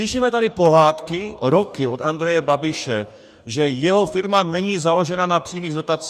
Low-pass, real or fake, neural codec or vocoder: 14.4 kHz; fake; codec, 44.1 kHz, 2.6 kbps, DAC